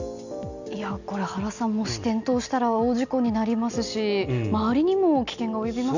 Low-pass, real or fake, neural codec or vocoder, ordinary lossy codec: 7.2 kHz; real; none; none